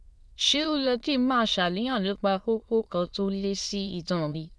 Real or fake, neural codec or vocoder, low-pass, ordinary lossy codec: fake; autoencoder, 22.05 kHz, a latent of 192 numbers a frame, VITS, trained on many speakers; none; none